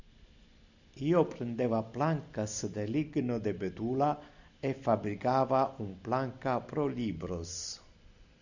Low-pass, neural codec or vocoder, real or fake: 7.2 kHz; none; real